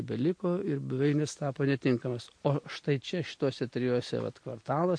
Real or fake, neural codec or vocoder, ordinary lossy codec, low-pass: real; none; MP3, 48 kbps; 9.9 kHz